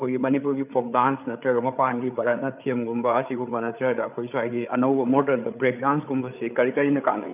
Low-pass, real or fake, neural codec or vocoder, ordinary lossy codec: 3.6 kHz; fake; codec, 16 kHz, 8 kbps, FreqCodec, larger model; none